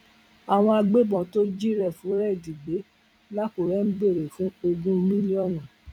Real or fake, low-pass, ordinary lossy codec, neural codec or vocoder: fake; 19.8 kHz; none; vocoder, 44.1 kHz, 128 mel bands every 256 samples, BigVGAN v2